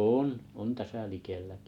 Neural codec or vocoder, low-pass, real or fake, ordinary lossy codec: none; 19.8 kHz; real; none